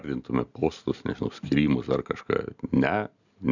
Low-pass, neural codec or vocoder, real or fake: 7.2 kHz; none; real